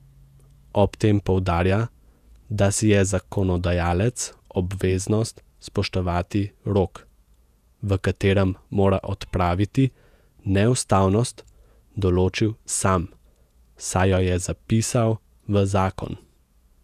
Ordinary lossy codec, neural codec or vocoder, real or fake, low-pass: none; vocoder, 48 kHz, 128 mel bands, Vocos; fake; 14.4 kHz